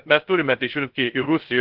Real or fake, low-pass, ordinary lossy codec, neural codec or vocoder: fake; 5.4 kHz; Opus, 16 kbps; codec, 16 kHz, 0.3 kbps, FocalCodec